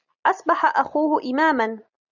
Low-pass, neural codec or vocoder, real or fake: 7.2 kHz; none; real